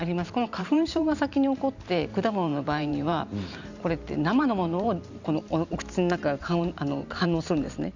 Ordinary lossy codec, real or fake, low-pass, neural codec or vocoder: Opus, 64 kbps; fake; 7.2 kHz; vocoder, 44.1 kHz, 128 mel bands every 512 samples, BigVGAN v2